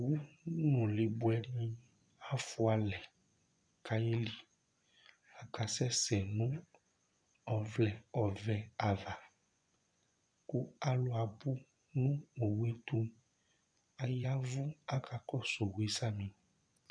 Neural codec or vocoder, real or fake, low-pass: vocoder, 24 kHz, 100 mel bands, Vocos; fake; 9.9 kHz